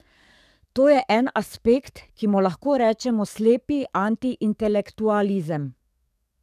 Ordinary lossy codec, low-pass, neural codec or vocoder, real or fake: none; 14.4 kHz; codec, 44.1 kHz, 7.8 kbps, DAC; fake